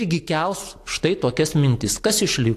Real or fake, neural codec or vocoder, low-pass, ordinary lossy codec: real; none; 14.4 kHz; AAC, 64 kbps